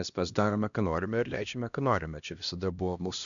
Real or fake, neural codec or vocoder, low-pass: fake; codec, 16 kHz, 1 kbps, X-Codec, HuBERT features, trained on LibriSpeech; 7.2 kHz